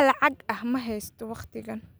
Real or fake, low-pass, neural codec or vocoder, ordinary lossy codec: real; none; none; none